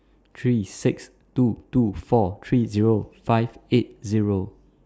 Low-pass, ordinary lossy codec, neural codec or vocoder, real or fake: none; none; none; real